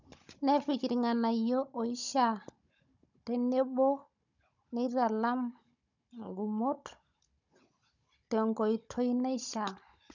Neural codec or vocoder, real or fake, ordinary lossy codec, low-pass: codec, 16 kHz, 16 kbps, FunCodec, trained on Chinese and English, 50 frames a second; fake; none; 7.2 kHz